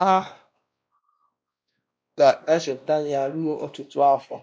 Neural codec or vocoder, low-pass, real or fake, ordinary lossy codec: codec, 16 kHz, 1 kbps, X-Codec, WavLM features, trained on Multilingual LibriSpeech; none; fake; none